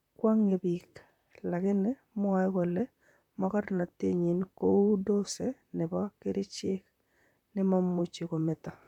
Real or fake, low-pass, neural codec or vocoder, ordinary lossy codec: fake; 19.8 kHz; vocoder, 44.1 kHz, 128 mel bands, Pupu-Vocoder; none